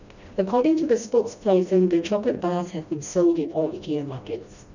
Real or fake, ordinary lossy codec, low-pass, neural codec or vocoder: fake; none; 7.2 kHz; codec, 16 kHz, 1 kbps, FreqCodec, smaller model